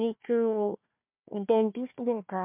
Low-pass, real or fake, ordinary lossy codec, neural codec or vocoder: 3.6 kHz; fake; MP3, 32 kbps; codec, 16 kHz, 1 kbps, FunCodec, trained on Chinese and English, 50 frames a second